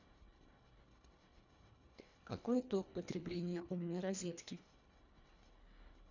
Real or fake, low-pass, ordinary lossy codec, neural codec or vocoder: fake; 7.2 kHz; none; codec, 24 kHz, 1.5 kbps, HILCodec